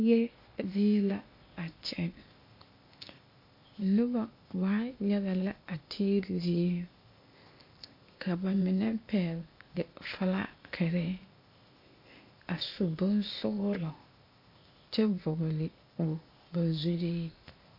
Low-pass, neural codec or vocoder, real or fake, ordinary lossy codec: 5.4 kHz; codec, 16 kHz, 0.8 kbps, ZipCodec; fake; MP3, 32 kbps